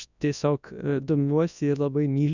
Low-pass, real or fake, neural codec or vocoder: 7.2 kHz; fake; codec, 24 kHz, 0.9 kbps, WavTokenizer, large speech release